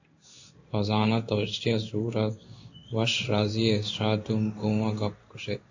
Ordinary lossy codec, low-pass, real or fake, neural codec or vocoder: AAC, 48 kbps; 7.2 kHz; fake; codec, 16 kHz in and 24 kHz out, 1 kbps, XY-Tokenizer